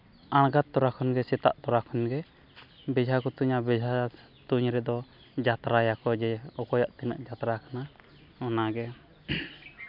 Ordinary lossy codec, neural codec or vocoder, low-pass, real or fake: none; none; 5.4 kHz; real